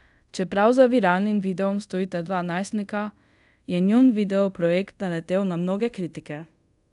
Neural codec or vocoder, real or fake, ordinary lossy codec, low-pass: codec, 24 kHz, 0.5 kbps, DualCodec; fake; none; 10.8 kHz